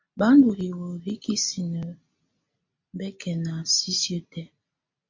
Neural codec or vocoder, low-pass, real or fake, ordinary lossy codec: none; 7.2 kHz; real; MP3, 48 kbps